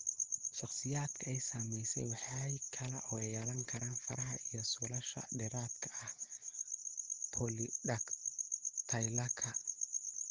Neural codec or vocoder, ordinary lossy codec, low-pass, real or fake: none; Opus, 16 kbps; 7.2 kHz; real